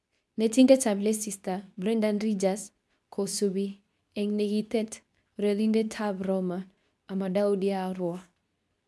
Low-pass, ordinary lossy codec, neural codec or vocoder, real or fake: none; none; codec, 24 kHz, 0.9 kbps, WavTokenizer, medium speech release version 2; fake